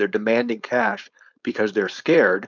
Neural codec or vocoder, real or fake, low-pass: codec, 16 kHz, 4.8 kbps, FACodec; fake; 7.2 kHz